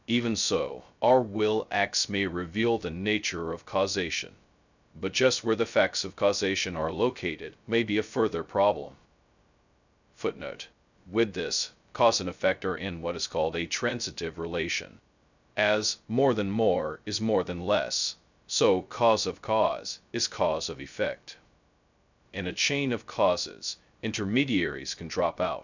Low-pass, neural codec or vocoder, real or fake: 7.2 kHz; codec, 16 kHz, 0.2 kbps, FocalCodec; fake